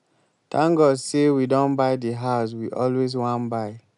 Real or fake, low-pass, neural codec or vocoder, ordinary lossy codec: real; 10.8 kHz; none; none